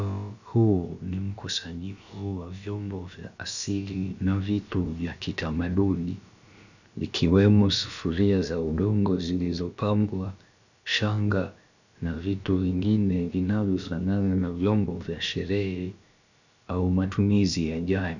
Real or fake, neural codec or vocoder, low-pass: fake; codec, 16 kHz, about 1 kbps, DyCAST, with the encoder's durations; 7.2 kHz